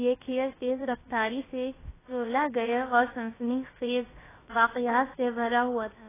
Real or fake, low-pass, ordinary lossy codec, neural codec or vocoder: fake; 3.6 kHz; AAC, 16 kbps; codec, 16 kHz, about 1 kbps, DyCAST, with the encoder's durations